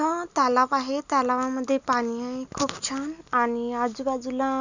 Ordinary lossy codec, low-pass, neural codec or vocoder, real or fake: none; 7.2 kHz; none; real